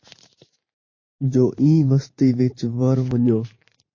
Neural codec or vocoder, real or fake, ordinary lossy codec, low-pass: vocoder, 44.1 kHz, 128 mel bands every 512 samples, BigVGAN v2; fake; MP3, 32 kbps; 7.2 kHz